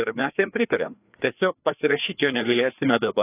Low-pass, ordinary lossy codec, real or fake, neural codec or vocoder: 3.6 kHz; AAC, 24 kbps; fake; codec, 16 kHz, 2 kbps, FreqCodec, larger model